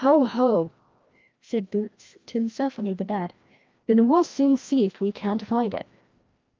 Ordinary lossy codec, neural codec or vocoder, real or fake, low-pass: Opus, 24 kbps; codec, 16 kHz, 1 kbps, FreqCodec, larger model; fake; 7.2 kHz